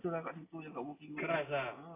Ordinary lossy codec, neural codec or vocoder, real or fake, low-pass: AAC, 16 kbps; none; real; 3.6 kHz